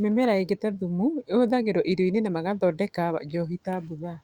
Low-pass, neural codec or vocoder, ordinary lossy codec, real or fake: 19.8 kHz; autoencoder, 48 kHz, 128 numbers a frame, DAC-VAE, trained on Japanese speech; Opus, 64 kbps; fake